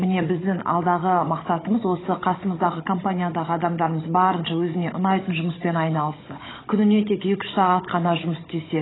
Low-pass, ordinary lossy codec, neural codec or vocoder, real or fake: 7.2 kHz; AAC, 16 kbps; codec, 16 kHz, 16 kbps, FunCodec, trained on Chinese and English, 50 frames a second; fake